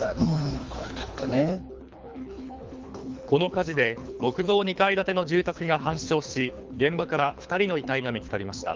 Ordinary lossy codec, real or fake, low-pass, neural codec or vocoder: Opus, 32 kbps; fake; 7.2 kHz; codec, 24 kHz, 3 kbps, HILCodec